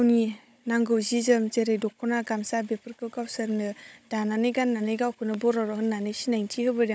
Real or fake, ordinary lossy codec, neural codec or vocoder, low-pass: fake; none; codec, 16 kHz, 16 kbps, FunCodec, trained on Chinese and English, 50 frames a second; none